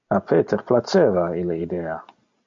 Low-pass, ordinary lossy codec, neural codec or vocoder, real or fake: 7.2 kHz; MP3, 64 kbps; none; real